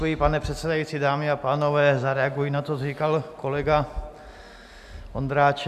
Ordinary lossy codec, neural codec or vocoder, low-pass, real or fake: AAC, 96 kbps; none; 14.4 kHz; real